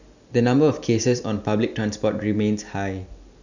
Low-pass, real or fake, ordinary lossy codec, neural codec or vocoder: 7.2 kHz; real; none; none